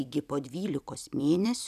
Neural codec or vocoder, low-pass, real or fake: vocoder, 44.1 kHz, 128 mel bands every 256 samples, BigVGAN v2; 14.4 kHz; fake